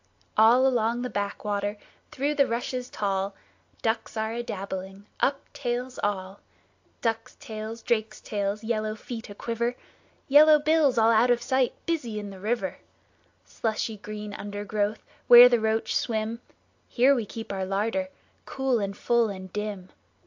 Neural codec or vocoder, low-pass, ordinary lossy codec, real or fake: none; 7.2 kHz; AAC, 48 kbps; real